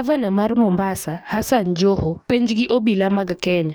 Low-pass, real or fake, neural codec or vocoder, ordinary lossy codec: none; fake; codec, 44.1 kHz, 2.6 kbps, DAC; none